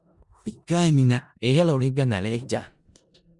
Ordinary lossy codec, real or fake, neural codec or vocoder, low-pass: Opus, 64 kbps; fake; codec, 16 kHz in and 24 kHz out, 0.4 kbps, LongCat-Audio-Codec, four codebook decoder; 10.8 kHz